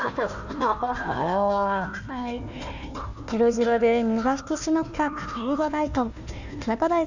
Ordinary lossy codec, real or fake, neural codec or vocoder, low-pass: none; fake; codec, 16 kHz, 1 kbps, FunCodec, trained on Chinese and English, 50 frames a second; 7.2 kHz